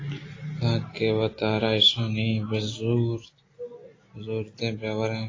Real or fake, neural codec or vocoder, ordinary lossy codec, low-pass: real; none; AAC, 32 kbps; 7.2 kHz